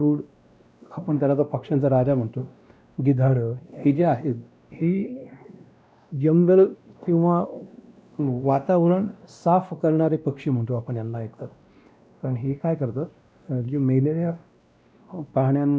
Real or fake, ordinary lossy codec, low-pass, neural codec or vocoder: fake; none; none; codec, 16 kHz, 1 kbps, X-Codec, WavLM features, trained on Multilingual LibriSpeech